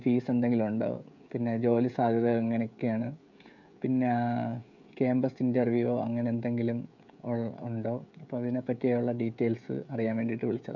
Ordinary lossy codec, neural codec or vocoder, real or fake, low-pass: none; codec, 16 kHz, 16 kbps, FreqCodec, smaller model; fake; 7.2 kHz